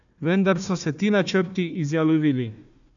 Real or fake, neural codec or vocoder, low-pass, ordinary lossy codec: fake; codec, 16 kHz, 1 kbps, FunCodec, trained on Chinese and English, 50 frames a second; 7.2 kHz; none